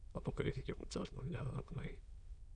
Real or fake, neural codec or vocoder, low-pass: fake; autoencoder, 22.05 kHz, a latent of 192 numbers a frame, VITS, trained on many speakers; 9.9 kHz